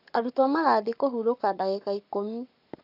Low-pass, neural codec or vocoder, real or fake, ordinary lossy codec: 5.4 kHz; codec, 44.1 kHz, 7.8 kbps, Pupu-Codec; fake; none